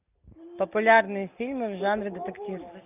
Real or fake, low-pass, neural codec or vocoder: real; 3.6 kHz; none